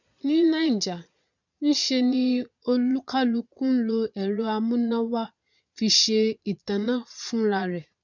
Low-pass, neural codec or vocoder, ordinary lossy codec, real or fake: 7.2 kHz; vocoder, 22.05 kHz, 80 mel bands, Vocos; none; fake